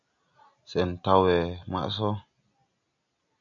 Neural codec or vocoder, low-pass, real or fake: none; 7.2 kHz; real